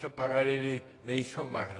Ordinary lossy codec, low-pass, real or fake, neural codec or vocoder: AAC, 32 kbps; 10.8 kHz; fake; codec, 24 kHz, 0.9 kbps, WavTokenizer, medium music audio release